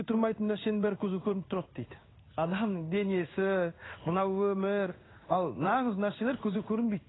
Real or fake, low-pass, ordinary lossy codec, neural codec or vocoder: fake; 7.2 kHz; AAC, 16 kbps; codec, 16 kHz in and 24 kHz out, 1 kbps, XY-Tokenizer